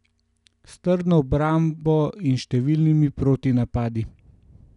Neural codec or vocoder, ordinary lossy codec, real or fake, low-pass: none; none; real; 10.8 kHz